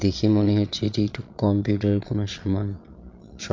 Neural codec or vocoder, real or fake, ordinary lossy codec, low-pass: vocoder, 22.05 kHz, 80 mel bands, Vocos; fake; MP3, 48 kbps; 7.2 kHz